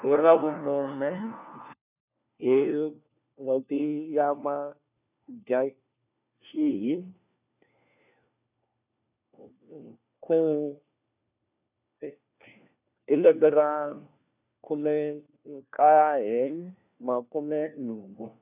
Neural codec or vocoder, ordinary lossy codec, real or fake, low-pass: codec, 16 kHz, 1 kbps, FunCodec, trained on LibriTTS, 50 frames a second; none; fake; 3.6 kHz